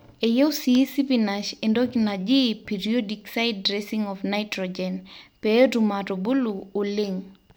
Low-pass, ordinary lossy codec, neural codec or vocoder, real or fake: none; none; none; real